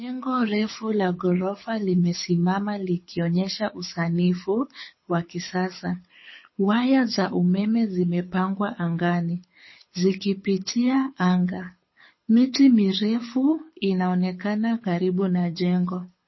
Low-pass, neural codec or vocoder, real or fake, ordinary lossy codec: 7.2 kHz; codec, 24 kHz, 6 kbps, HILCodec; fake; MP3, 24 kbps